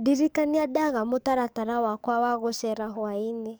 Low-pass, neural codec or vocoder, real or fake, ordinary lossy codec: none; codec, 44.1 kHz, 7.8 kbps, DAC; fake; none